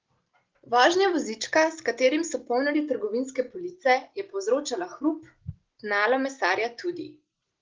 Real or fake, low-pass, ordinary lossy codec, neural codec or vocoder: real; 7.2 kHz; Opus, 16 kbps; none